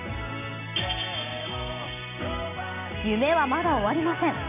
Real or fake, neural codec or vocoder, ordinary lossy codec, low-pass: real; none; MP3, 24 kbps; 3.6 kHz